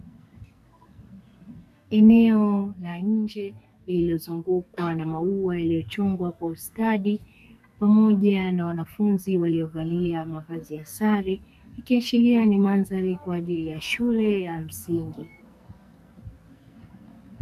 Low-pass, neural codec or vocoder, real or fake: 14.4 kHz; codec, 44.1 kHz, 2.6 kbps, SNAC; fake